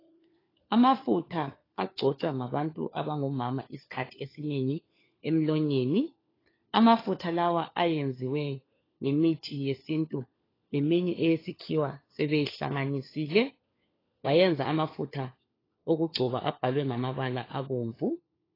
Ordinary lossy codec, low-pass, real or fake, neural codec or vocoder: AAC, 24 kbps; 5.4 kHz; fake; codec, 16 kHz, 4 kbps, FunCodec, trained on LibriTTS, 50 frames a second